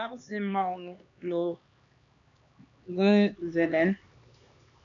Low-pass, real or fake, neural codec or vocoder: 7.2 kHz; fake; codec, 16 kHz, 2 kbps, X-Codec, HuBERT features, trained on LibriSpeech